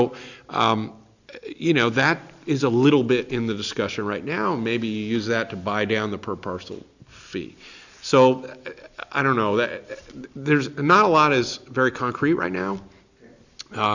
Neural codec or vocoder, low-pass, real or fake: none; 7.2 kHz; real